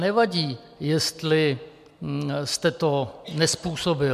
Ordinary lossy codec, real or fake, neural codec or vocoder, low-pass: MP3, 96 kbps; real; none; 14.4 kHz